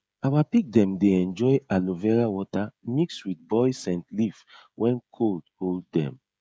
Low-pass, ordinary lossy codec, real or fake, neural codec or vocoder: none; none; fake; codec, 16 kHz, 16 kbps, FreqCodec, smaller model